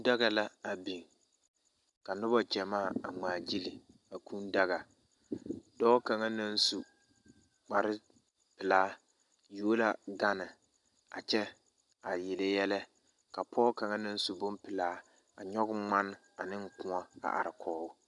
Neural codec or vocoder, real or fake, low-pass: none; real; 10.8 kHz